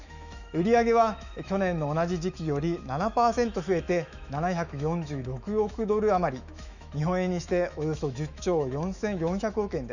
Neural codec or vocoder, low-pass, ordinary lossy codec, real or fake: autoencoder, 48 kHz, 128 numbers a frame, DAC-VAE, trained on Japanese speech; 7.2 kHz; none; fake